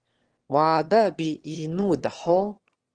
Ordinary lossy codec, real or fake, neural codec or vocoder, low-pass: Opus, 16 kbps; fake; autoencoder, 22.05 kHz, a latent of 192 numbers a frame, VITS, trained on one speaker; 9.9 kHz